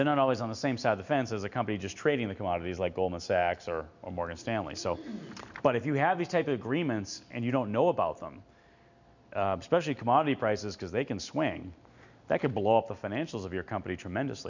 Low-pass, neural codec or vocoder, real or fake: 7.2 kHz; none; real